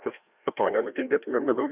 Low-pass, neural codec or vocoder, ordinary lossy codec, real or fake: 3.6 kHz; codec, 16 kHz, 1 kbps, FreqCodec, larger model; Opus, 64 kbps; fake